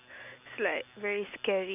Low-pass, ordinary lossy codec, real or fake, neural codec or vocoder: 3.6 kHz; none; real; none